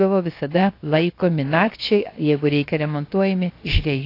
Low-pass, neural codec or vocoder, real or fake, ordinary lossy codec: 5.4 kHz; codec, 16 kHz, 0.7 kbps, FocalCodec; fake; AAC, 32 kbps